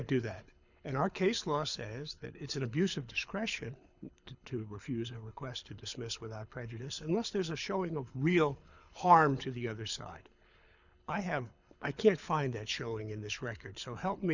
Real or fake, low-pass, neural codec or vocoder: fake; 7.2 kHz; codec, 24 kHz, 6 kbps, HILCodec